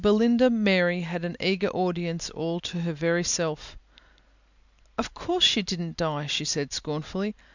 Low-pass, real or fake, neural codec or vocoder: 7.2 kHz; real; none